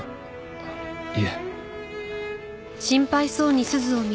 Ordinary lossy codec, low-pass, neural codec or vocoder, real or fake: none; none; none; real